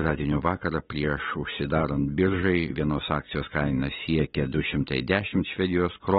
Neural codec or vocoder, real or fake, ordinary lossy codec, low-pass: none; real; AAC, 16 kbps; 19.8 kHz